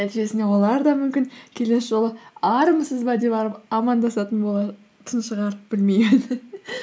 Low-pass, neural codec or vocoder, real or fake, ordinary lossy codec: none; none; real; none